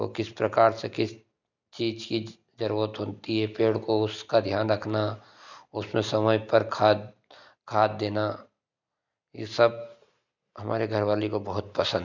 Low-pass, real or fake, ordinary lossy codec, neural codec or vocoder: 7.2 kHz; real; none; none